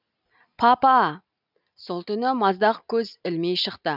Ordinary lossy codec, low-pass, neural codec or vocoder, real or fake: none; 5.4 kHz; none; real